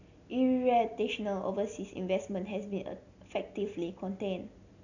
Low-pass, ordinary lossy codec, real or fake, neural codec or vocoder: 7.2 kHz; none; real; none